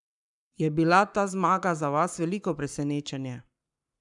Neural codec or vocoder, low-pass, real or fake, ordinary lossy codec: codec, 44.1 kHz, 7.8 kbps, Pupu-Codec; 10.8 kHz; fake; none